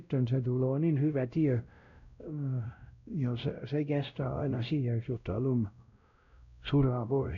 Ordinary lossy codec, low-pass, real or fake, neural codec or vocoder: none; 7.2 kHz; fake; codec, 16 kHz, 0.5 kbps, X-Codec, WavLM features, trained on Multilingual LibriSpeech